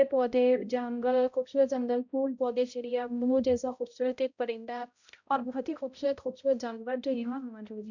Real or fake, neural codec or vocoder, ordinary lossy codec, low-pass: fake; codec, 16 kHz, 0.5 kbps, X-Codec, HuBERT features, trained on balanced general audio; none; 7.2 kHz